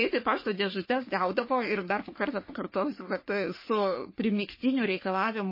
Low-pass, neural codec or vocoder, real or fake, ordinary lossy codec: 5.4 kHz; codec, 44.1 kHz, 3.4 kbps, Pupu-Codec; fake; MP3, 24 kbps